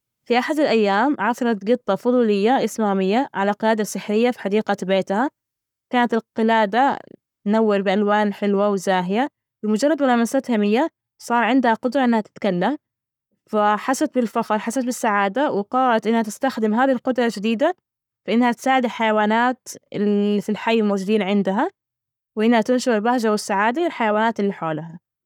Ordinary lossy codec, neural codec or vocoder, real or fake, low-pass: none; codec, 44.1 kHz, 7.8 kbps, Pupu-Codec; fake; 19.8 kHz